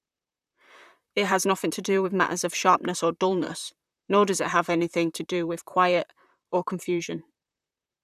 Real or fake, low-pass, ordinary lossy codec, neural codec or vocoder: fake; 14.4 kHz; none; vocoder, 44.1 kHz, 128 mel bands, Pupu-Vocoder